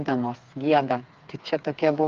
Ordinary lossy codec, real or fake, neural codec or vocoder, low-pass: Opus, 24 kbps; fake; codec, 16 kHz, 4 kbps, FreqCodec, smaller model; 7.2 kHz